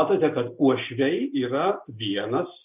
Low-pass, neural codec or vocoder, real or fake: 3.6 kHz; none; real